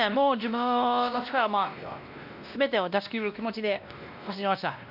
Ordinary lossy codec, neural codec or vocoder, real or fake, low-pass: none; codec, 16 kHz, 1 kbps, X-Codec, WavLM features, trained on Multilingual LibriSpeech; fake; 5.4 kHz